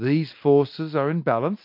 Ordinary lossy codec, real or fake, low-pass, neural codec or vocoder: MP3, 32 kbps; real; 5.4 kHz; none